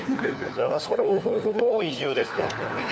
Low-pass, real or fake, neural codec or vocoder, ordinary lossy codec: none; fake; codec, 16 kHz, 4 kbps, FunCodec, trained on LibriTTS, 50 frames a second; none